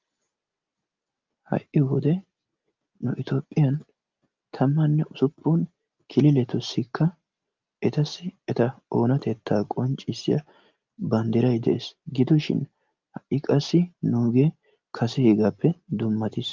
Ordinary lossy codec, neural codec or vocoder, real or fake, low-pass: Opus, 24 kbps; none; real; 7.2 kHz